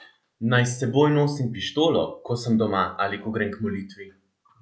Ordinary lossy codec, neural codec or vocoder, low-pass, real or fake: none; none; none; real